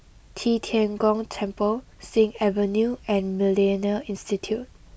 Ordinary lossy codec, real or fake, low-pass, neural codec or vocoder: none; real; none; none